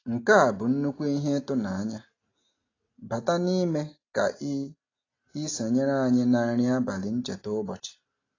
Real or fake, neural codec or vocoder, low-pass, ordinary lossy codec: real; none; 7.2 kHz; AAC, 32 kbps